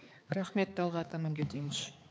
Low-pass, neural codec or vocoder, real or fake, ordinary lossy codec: none; codec, 16 kHz, 4 kbps, X-Codec, HuBERT features, trained on balanced general audio; fake; none